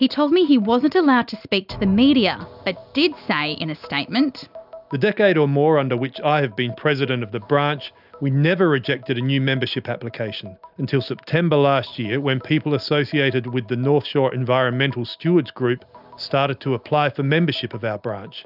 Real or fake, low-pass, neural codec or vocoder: real; 5.4 kHz; none